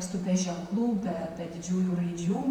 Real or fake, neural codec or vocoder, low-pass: fake; vocoder, 44.1 kHz, 128 mel bands, Pupu-Vocoder; 19.8 kHz